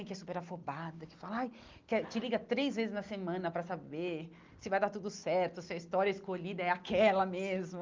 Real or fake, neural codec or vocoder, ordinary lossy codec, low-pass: real; none; Opus, 32 kbps; 7.2 kHz